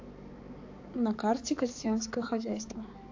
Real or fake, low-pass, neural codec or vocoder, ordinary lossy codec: fake; 7.2 kHz; codec, 16 kHz, 4 kbps, X-Codec, HuBERT features, trained on balanced general audio; AAC, 48 kbps